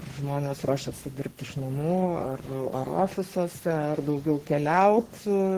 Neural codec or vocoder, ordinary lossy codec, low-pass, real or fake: codec, 44.1 kHz, 3.4 kbps, Pupu-Codec; Opus, 16 kbps; 14.4 kHz; fake